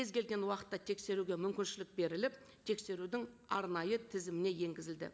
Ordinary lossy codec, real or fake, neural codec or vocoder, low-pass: none; real; none; none